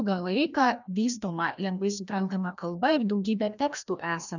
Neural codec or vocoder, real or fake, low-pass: codec, 16 kHz, 1 kbps, FreqCodec, larger model; fake; 7.2 kHz